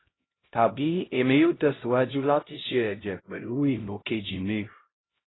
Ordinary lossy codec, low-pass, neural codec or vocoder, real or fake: AAC, 16 kbps; 7.2 kHz; codec, 16 kHz, 0.5 kbps, X-Codec, HuBERT features, trained on LibriSpeech; fake